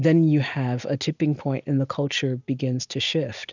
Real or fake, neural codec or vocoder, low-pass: real; none; 7.2 kHz